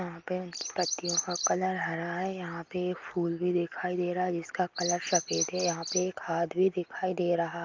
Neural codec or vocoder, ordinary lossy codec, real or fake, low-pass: none; Opus, 24 kbps; real; 7.2 kHz